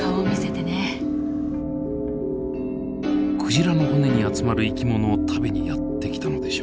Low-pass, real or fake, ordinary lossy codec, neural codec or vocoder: none; real; none; none